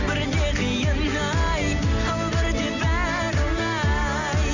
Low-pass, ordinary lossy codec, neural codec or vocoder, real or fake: 7.2 kHz; none; none; real